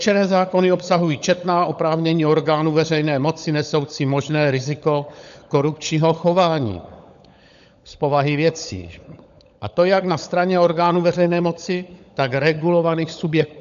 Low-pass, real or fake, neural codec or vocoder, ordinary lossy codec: 7.2 kHz; fake; codec, 16 kHz, 16 kbps, FunCodec, trained on LibriTTS, 50 frames a second; AAC, 96 kbps